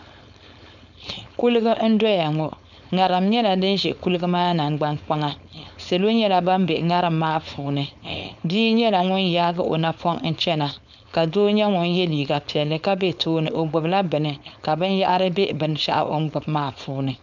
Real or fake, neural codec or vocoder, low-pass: fake; codec, 16 kHz, 4.8 kbps, FACodec; 7.2 kHz